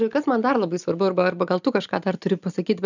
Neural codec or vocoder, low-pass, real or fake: none; 7.2 kHz; real